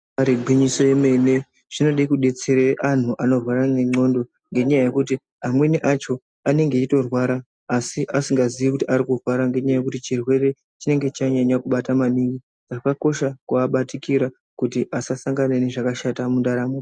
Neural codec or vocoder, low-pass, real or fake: none; 9.9 kHz; real